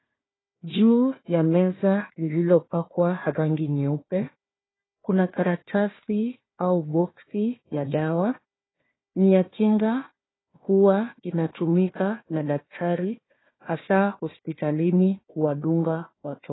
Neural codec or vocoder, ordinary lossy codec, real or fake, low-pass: codec, 16 kHz, 1 kbps, FunCodec, trained on Chinese and English, 50 frames a second; AAC, 16 kbps; fake; 7.2 kHz